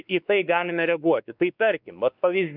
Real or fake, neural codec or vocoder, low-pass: fake; codec, 16 kHz, 2 kbps, X-Codec, WavLM features, trained on Multilingual LibriSpeech; 5.4 kHz